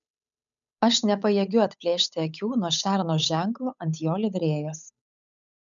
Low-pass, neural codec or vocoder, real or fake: 7.2 kHz; codec, 16 kHz, 8 kbps, FunCodec, trained on Chinese and English, 25 frames a second; fake